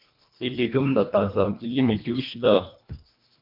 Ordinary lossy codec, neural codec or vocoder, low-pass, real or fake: MP3, 48 kbps; codec, 24 kHz, 1.5 kbps, HILCodec; 5.4 kHz; fake